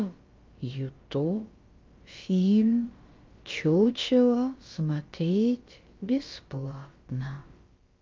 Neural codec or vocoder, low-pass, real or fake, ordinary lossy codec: codec, 16 kHz, about 1 kbps, DyCAST, with the encoder's durations; 7.2 kHz; fake; Opus, 24 kbps